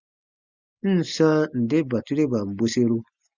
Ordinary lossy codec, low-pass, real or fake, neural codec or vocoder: Opus, 64 kbps; 7.2 kHz; real; none